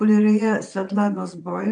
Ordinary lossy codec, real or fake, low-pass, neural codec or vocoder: AAC, 64 kbps; fake; 9.9 kHz; vocoder, 22.05 kHz, 80 mel bands, Vocos